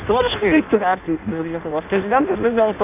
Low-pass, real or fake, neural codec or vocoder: 3.6 kHz; fake; codec, 16 kHz in and 24 kHz out, 0.6 kbps, FireRedTTS-2 codec